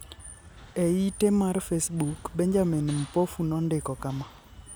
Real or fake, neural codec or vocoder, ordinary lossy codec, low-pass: real; none; none; none